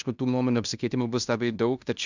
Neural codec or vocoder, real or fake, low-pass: codec, 16 kHz in and 24 kHz out, 0.9 kbps, LongCat-Audio-Codec, fine tuned four codebook decoder; fake; 7.2 kHz